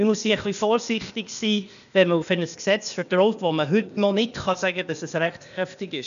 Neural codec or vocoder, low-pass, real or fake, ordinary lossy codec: codec, 16 kHz, 0.8 kbps, ZipCodec; 7.2 kHz; fake; MP3, 96 kbps